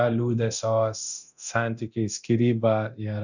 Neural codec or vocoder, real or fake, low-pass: codec, 24 kHz, 0.9 kbps, DualCodec; fake; 7.2 kHz